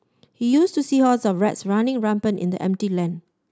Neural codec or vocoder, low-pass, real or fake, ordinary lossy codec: none; none; real; none